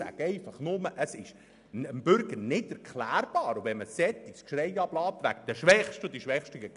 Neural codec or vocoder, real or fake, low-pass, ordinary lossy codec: none; real; 10.8 kHz; none